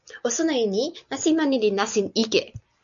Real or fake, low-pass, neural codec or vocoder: real; 7.2 kHz; none